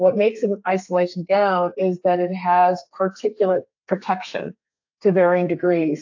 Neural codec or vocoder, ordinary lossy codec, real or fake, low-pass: codec, 32 kHz, 1.9 kbps, SNAC; AAC, 48 kbps; fake; 7.2 kHz